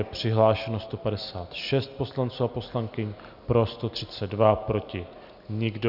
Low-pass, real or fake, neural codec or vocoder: 5.4 kHz; real; none